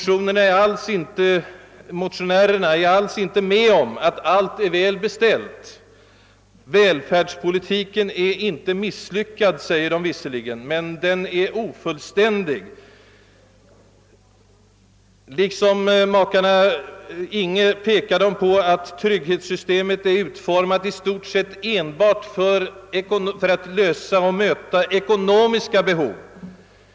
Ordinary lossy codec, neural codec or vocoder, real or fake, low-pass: none; none; real; none